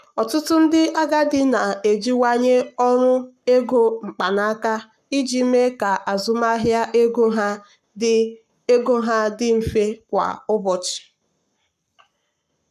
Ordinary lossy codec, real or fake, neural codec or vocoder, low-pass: none; fake; codec, 44.1 kHz, 7.8 kbps, Pupu-Codec; 14.4 kHz